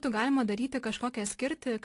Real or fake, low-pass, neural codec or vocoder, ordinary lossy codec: real; 10.8 kHz; none; AAC, 48 kbps